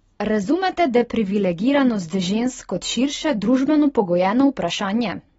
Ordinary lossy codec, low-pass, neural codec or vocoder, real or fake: AAC, 24 kbps; 19.8 kHz; none; real